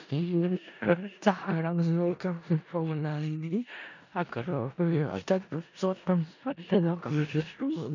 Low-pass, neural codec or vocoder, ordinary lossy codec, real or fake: 7.2 kHz; codec, 16 kHz in and 24 kHz out, 0.4 kbps, LongCat-Audio-Codec, four codebook decoder; none; fake